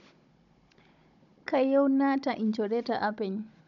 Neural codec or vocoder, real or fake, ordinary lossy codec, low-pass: codec, 16 kHz, 16 kbps, FunCodec, trained on Chinese and English, 50 frames a second; fake; none; 7.2 kHz